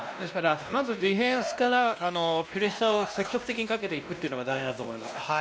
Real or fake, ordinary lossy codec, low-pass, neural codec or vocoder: fake; none; none; codec, 16 kHz, 1 kbps, X-Codec, WavLM features, trained on Multilingual LibriSpeech